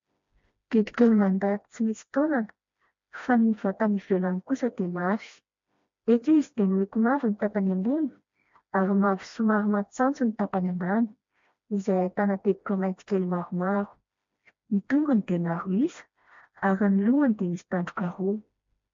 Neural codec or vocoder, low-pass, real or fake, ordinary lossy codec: codec, 16 kHz, 1 kbps, FreqCodec, smaller model; 7.2 kHz; fake; MP3, 48 kbps